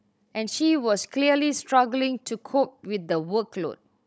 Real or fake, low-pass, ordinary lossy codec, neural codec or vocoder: fake; none; none; codec, 16 kHz, 16 kbps, FunCodec, trained on Chinese and English, 50 frames a second